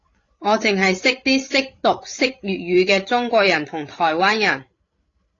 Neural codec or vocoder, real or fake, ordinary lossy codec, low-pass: codec, 16 kHz, 16 kbps, FreqCodec, larger model; fake; AAC, 32 kbps; 7.2 kHz